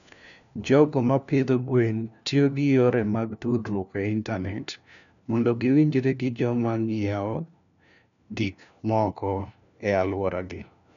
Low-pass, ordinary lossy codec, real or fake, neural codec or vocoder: 7.2 kHz; none; fake; codec, 16 kHz, 1 kbps, FunCodec, trained on LibriTTS, 50 frames a second